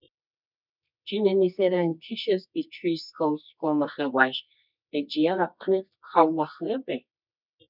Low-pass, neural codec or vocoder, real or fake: 5.4 kHz; codec, 24 kHz, 0.9 kbps, WavTokenizer, medium music audio release; fake